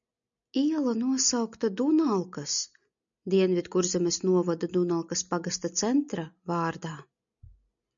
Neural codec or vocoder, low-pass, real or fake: none; 7.2 kHz; real